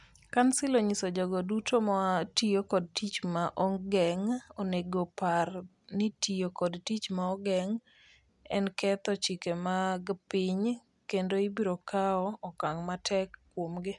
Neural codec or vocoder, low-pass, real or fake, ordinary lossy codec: none; 10.8 kHz; real; none